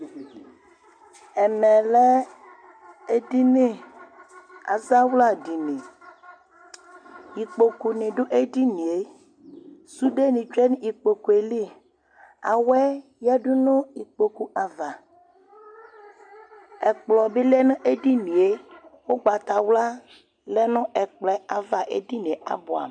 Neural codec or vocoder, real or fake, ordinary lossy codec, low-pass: none; real; MP3, 96 kbps; 9.9 kHz